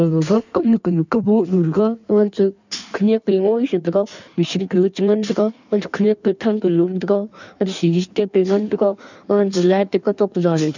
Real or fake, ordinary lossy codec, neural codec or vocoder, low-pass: fake; none; codec, 16 kHz in and 24 kHz out, 1.1 kbps, FireRedTTS-2 codec; 7.2 kHz